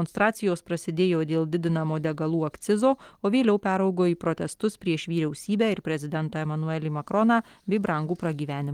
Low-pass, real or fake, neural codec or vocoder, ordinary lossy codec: 19.8 kHz; fake; vocoder, 44.1 kHz, 128 mel bands every 256 samples, BigVGAN v2; Opus, 24 kbps